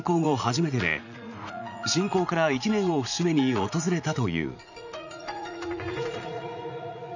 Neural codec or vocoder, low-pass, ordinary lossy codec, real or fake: vocoder, 44.1 kHz, 80 mel bands, Vocos; 7.2 kHz; none; fake